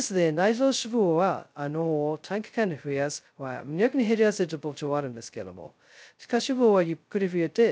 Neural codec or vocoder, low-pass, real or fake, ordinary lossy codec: codec, 16 kHz, 0.2 kbps, FocalCodec; none; fake; none